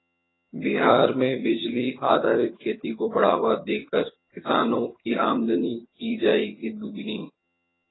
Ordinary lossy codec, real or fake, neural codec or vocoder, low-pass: AAC, 16 kbps; fake; vocoder, 22.05 kHz, 80 mel bands, HiFi-GAN; 7.2 kHz